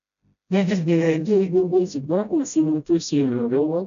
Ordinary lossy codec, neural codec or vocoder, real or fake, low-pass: none; codec, 16 kHz, 0.5 kbps, FreqCodec, smaller model; fake; 7.2 kHz